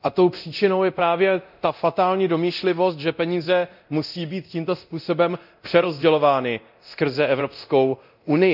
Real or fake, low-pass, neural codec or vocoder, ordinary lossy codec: fake; 5.4 kHz; codec, 24 kHz, 0.9 kbps, DualCodec; none